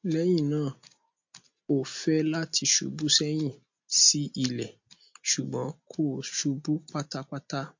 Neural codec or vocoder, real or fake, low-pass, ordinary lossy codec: none; real; 7.2 kHz; MP3, 48 kbps